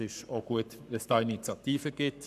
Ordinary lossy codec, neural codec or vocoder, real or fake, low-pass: none; codec, 44.1 kHz, 7.8 kbps, Pupu-Codec; fake; 14.4 kHz